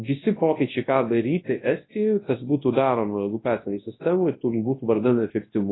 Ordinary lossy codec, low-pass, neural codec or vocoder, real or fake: AAC, 16 kbps; 7.2 kHz; codec, 24 kHz, 0.9 kbps, WavTokenizer, large speech release; fake